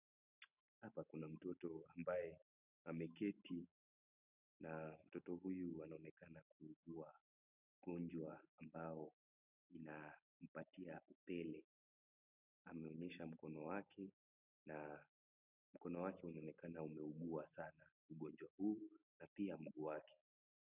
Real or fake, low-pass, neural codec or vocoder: real; 3.6 kHz; none